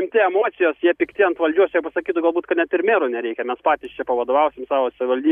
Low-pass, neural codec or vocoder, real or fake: 14.4 kHz; none; real